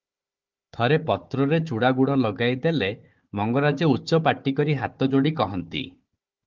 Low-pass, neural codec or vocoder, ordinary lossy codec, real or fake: 7.2 kHz; codec, 16 kHz, 4 kbps, FunCodec, trained on Chinese and English, 50 frames a second; Opus, 32 kbps; fake